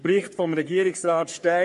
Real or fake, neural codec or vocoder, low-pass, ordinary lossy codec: fake; codec, 44.1 kHz, 3.4 kbps, Pupu-Codec; 14.4 kHz; MP3, 48 kbps